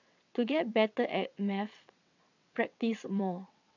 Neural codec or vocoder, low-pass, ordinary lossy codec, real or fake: vocoder, 22.05 kHz, 80 mel bands, WaveNeXt; 7.2 kHz; none; fake